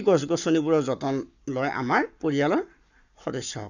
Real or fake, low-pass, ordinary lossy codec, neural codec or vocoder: fake; 7.2 kHz; none; codec, 44.1 kHz, 7.8 kbps, Pupu-Codec